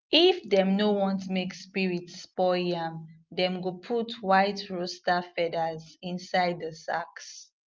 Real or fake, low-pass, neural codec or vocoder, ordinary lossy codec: real; 7.2 kHz; none; Opus, 24 kbps